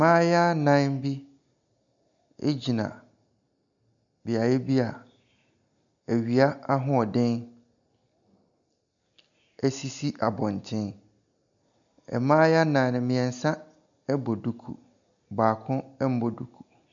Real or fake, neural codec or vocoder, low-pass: real; none; 7.2 kHz